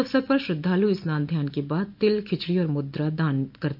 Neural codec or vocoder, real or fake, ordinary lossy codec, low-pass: none; real; none; 5.4 kHz